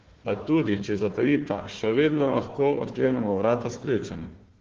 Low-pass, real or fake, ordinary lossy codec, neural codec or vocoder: 7.2 kHz; fake; Opus, 16 kbps; codec, 16 kHz, 1 kbps, FunCodec, trained on Chinese and English, 50 frames a second